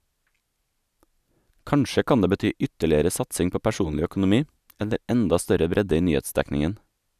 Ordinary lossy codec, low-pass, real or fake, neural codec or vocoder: none; 14.4 kHz; real; none